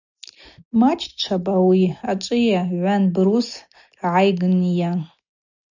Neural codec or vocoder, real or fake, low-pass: none; real; 7.2 kHz